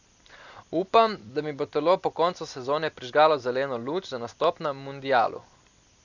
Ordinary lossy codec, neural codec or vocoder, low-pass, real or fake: none; none; 7.2 kHz; real